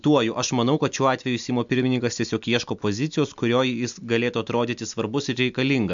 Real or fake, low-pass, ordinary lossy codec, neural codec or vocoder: real; 7.2 kHz; MP3, 64 kbps; none